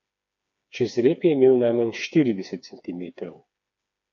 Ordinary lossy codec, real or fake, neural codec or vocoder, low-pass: MP3, 48 kbps; fake; codec, 16 kHz, 4 kbps, FreqCodec, smaller model; 7.2 kHz